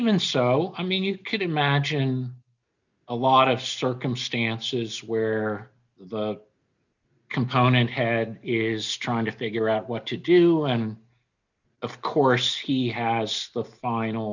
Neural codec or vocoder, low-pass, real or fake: none; 7.2 kHz; real